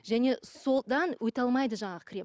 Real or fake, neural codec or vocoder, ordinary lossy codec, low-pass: real; none; none; none